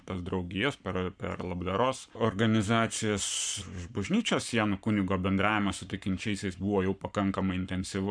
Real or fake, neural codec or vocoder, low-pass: fake; codec, 44.1 kHz, 7.8 kbps, Pupu-Codec; 9.9 kHz